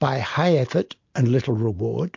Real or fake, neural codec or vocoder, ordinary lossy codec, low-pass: real; none; MP3, 48 kbps; 7.2 kHz